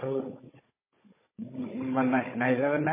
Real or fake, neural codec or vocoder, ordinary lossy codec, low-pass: fake; codec, 16 kHz, 16 kbps, FunCodec, trained on Chinese and English, 50 frames a second; MP3, 16 kbps; 3.6 kHz